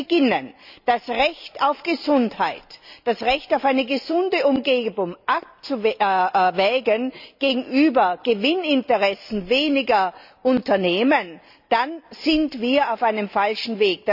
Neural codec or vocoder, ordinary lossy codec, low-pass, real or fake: none; none; 5.4 kHz; real